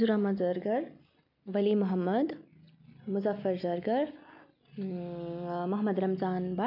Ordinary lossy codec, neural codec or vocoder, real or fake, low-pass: none; none; real; 5.4 kHz